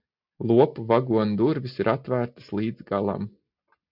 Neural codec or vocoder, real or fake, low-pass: none; real; 5.4 kHz